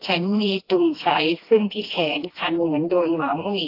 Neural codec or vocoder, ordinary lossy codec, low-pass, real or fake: codec, 16 kHz, 1 kbps, FreqCodec, smaller model; AAC, 32 kbps; 5.4 kHz; fake